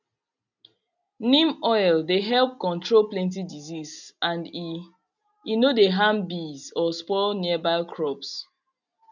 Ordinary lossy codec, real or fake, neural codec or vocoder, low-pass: none; real; none; 7.2 kHz